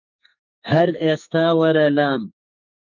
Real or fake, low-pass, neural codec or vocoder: fake; 7.2 kHz; codec, 44.1 kHz, 2.6 kbps, SNAC